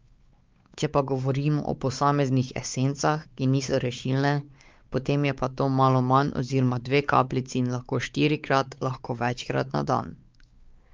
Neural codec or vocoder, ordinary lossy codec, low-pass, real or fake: codec, 16 kHz, 4 kbps, X-Codec, WavLM features, trained on Multilingual LibriSpeech; Opus, 24 kbps; 7.2 kHz; fake